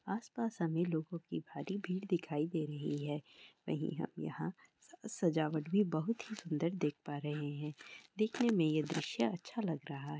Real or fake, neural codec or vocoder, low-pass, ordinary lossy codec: real; none; none; none